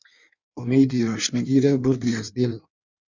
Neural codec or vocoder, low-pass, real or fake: codec, 16 kHz in and 24 kHz out, 1.1 kbps, FireRedTTS-2 codec; 7.2 kHz; fake